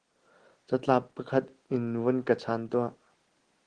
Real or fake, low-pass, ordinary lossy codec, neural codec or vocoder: real; 9.9 kHz; Opus, 16 kbps; none